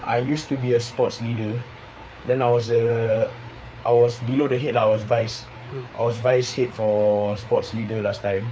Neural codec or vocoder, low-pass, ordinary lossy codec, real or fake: codec, 16 kHz, 4 kbps, FreqCodec, larger model; none; none; fake